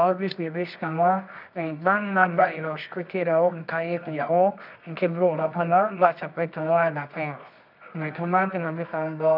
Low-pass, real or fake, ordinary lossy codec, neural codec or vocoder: 5.4 kHz; fake; none; codec, 24 kHz, 0.9 kbps, WavTokenizer, medium music audio release